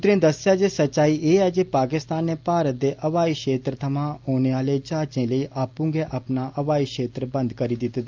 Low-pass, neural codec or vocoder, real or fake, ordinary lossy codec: 7.2 kHz; none; real; Opus, 24 kbps